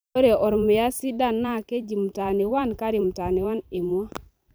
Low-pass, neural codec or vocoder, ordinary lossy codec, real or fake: none; vocoder, 44.1 kHz, 128 mel bands every 512 samples, BigVGAN v2; none; fake